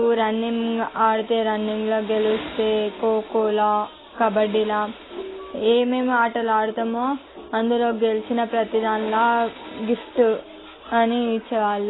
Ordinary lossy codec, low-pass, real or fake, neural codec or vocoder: AAC, 16 kbps; 7.2 kHz; real; none